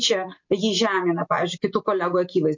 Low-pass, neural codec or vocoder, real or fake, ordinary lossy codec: 7.2 kHz; none; real; MP3, 48 kbps